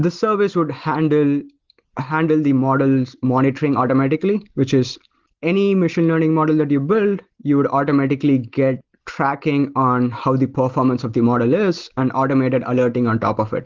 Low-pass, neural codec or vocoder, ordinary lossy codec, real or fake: 7.2 kHz; none; Opus, 16 kbps; real